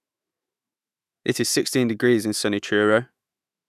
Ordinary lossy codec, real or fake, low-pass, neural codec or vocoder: none; fake; 14.4 kHz; autoencoder, 48 kHz, 128 numbers a frame, DAC-VAE, trained on Japanese speech